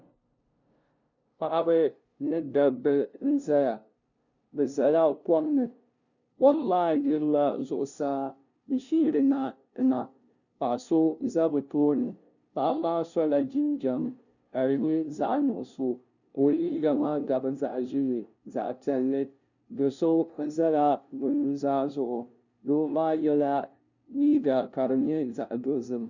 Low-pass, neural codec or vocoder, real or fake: 7.2 kHz; codec, 16 kHz, 0.5 kbps, FunCodec, trained on LibriTTS, 25 frames a second; fake